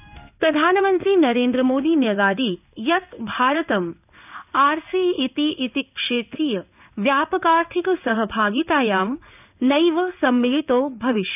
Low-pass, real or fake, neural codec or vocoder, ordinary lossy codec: 3.6 kHz; fake; codec, 16 kHz in and 24 kHz out, 1 kbps, XY-Tokenizer; none